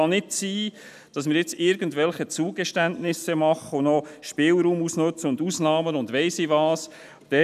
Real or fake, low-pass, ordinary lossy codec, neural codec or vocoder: real; 14.4 kHz; none; none